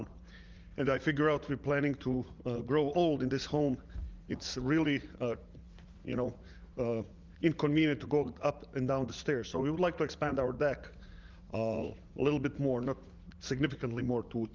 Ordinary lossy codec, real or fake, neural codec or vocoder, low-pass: Opus, 32 kbps; fake; vocoder, 44.1 kHz, 80 mel bands, Vocos; 7.2 kHz